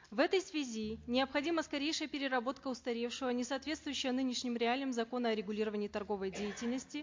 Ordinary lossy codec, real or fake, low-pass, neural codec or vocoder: MP3, 48 kbps; real; 7.2 kHz; none